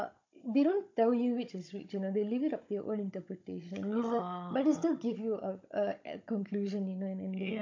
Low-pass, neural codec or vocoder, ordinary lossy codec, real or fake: 7.2 kHz; codec, 16 kHz, 16 kbps, FunCodec, trained on Chinese and English, 50 frames a second; MP3, 48 kbps; fake